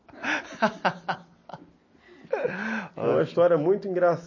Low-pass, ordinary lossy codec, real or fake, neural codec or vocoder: 7.2 kHz; MP3, 32 kbps; real; none